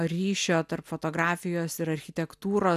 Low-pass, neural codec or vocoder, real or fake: 14.4 kHz; none; real